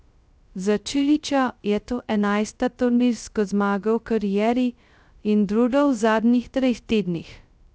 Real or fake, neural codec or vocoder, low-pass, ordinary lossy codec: fake; codec, 16 kHz, 0.2 kbps, FocalCodec; none; none